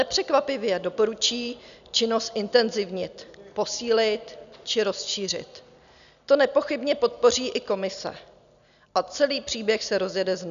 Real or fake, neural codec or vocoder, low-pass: real; none; 7.2 kHz